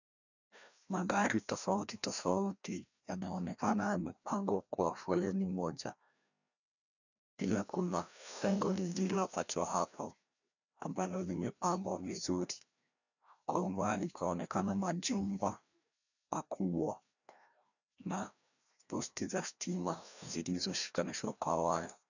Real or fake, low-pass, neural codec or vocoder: fake; 7.2 kHz; codec, 16 kHz, 1 kbps, FreqCodec, larger model